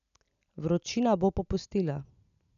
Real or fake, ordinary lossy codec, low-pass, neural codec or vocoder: real; none; 7.2 kHz; none